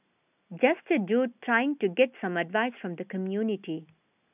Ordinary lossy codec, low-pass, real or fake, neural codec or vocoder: none; 3.6 kHz; real; none